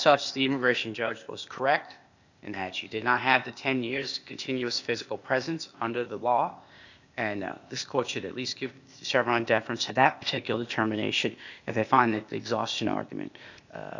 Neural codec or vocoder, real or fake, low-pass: codec, 16 kHz, 0.8 kbps, ZipCodec; fake; 7.2 kHz